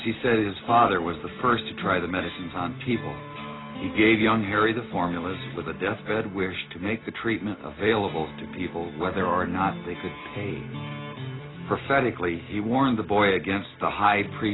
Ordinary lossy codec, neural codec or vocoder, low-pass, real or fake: AAC, 16 kbps; none; 7.2 kHz; real